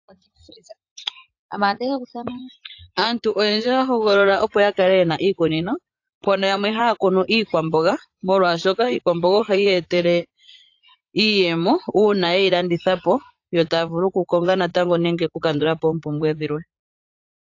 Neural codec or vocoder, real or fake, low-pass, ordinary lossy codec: vocoder, 44.1 kHz, 80 mel bands, Vocos; fake; 7.2 kHz; AAC, 48 kbps